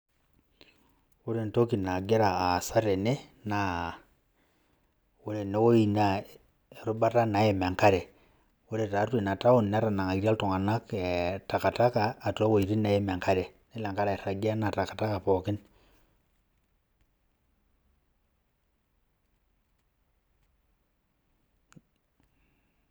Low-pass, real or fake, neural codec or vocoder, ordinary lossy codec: none; real; none; none